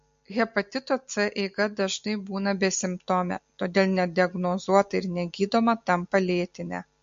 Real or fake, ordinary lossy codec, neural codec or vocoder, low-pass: real; MP3, 48 kbps; none; 7.2 kHz